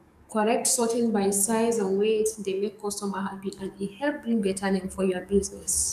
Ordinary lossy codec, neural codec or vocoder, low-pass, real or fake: none; codec, 44.1 kHz, 7.8 kbps, DAC; 14.4 kHz; fake